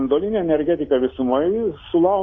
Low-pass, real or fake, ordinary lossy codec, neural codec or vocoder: 7.2 kHz; real; AAC, 32 kbps; none